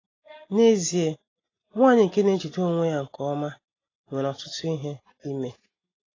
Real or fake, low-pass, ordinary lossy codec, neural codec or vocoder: real; 7.2 kHz; AAC, 32 kbps; none